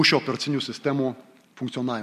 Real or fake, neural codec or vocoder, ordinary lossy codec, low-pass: real; none; MP3, 64 kbps; 14.4 kHz